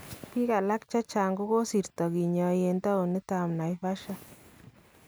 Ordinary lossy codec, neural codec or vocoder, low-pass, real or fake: none; none; none; real